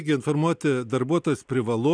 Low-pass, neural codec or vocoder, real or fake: 9.9 kHz; none; real